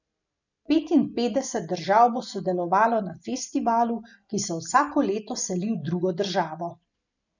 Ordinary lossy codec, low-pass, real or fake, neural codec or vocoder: none; 7.2 kHz; real; none